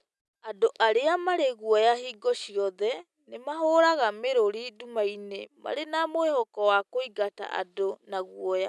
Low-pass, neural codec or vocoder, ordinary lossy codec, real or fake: none; none; none; real